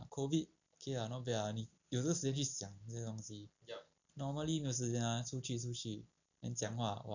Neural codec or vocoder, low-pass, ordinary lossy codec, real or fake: none; 7.2 kHz; none; real